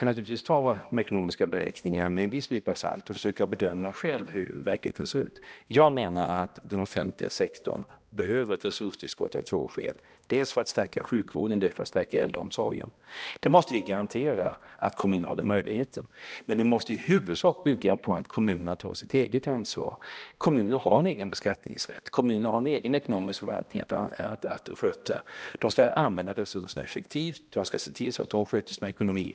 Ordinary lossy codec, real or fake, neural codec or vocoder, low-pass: none; fake; codec, 16 kHz, 1 kbps, X-Codec, HuBERT features, trained on balanced general audio; none